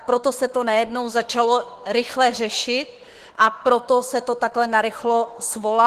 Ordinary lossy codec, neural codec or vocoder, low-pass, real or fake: Opus, 16 kbps; autoencoder, 48 kHz, 32 numbers a frame, DAC-VAE, trained on Japanese speech; 14.4 kHz; fake